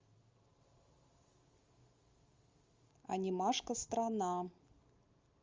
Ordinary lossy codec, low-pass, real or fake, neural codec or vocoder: Opus, 32 kbps; 7.2 kHz; real; none